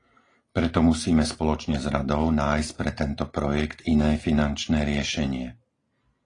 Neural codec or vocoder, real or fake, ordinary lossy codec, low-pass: none; real; AAC, 32 kbps; 9.9 kHz